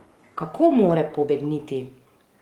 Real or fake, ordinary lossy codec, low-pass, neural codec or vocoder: fake; Opus, 24 kbps; 19.8 kHz; codec, 44.1 kHz, 7.8 kbps, DAC